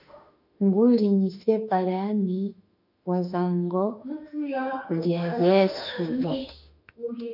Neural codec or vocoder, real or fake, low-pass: autoencoder, 48 kHz, 32 numbers a frame, DAC-VAE, trained on Japanese speech; fake; 5.4 kHz